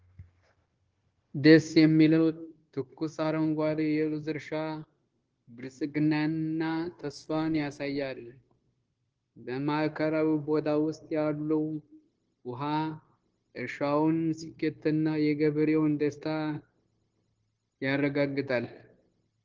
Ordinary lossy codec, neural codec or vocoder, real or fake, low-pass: Opus, 16 kbps; codec, 16 kHz, 0.9 kbps, LongCat-Audio-Codec; fake; 7.2 kHz